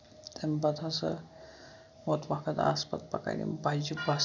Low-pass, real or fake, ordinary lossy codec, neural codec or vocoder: 7.2 kHz; real; none; none